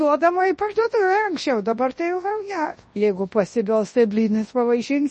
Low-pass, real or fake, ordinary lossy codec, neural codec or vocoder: 10.8 kHz; fake; MP3, 32 kbps; codec, 24 kHz, 0.9 kbps, WavTokenizer, large speech release